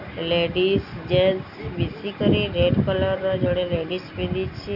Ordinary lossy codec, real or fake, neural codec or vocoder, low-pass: none; real; none; 5.4 kHz